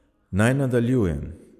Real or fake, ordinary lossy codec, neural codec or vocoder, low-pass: fake; none; vocoder, 44.1 kHz, 128 mel bands every 256 samples, BigVGAN v2; 14.4 kHz